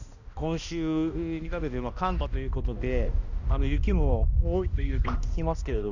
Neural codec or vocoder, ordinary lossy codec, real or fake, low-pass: codec, 16 kHz, 1 kbps, X-Codec, HuBERT features, trained on balanced general audio; none; fake; 7.2 kHz